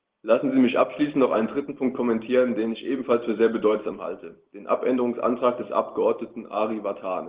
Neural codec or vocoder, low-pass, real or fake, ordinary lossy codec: none; 3.6 kHz; real; Opus, 16 kbps